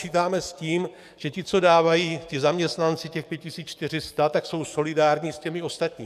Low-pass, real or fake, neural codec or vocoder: 14.4 kHz; fake; codec, 44.1 kHz, 7.8 kbps, DAC